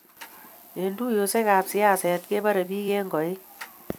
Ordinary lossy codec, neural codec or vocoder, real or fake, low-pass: none; vocoder, 44.1 kHz, 128 mel bands every 256 samples, BigVGAN v2; fake; none